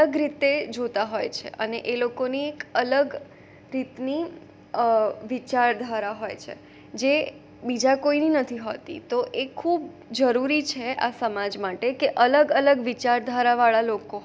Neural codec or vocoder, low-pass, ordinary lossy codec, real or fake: none; none; none; real